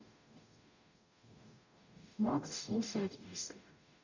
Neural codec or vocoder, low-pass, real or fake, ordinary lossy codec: codec, 44.1 kHz, 0.9 kbps, DAC; 7.2 kHz; fake; none